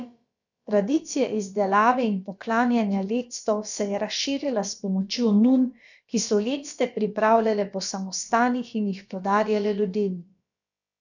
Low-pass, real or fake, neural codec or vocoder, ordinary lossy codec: 7.2 kHz; fake; codec, 16 kHz, about 1 kbps, DyCAST, with the encoder's durations; none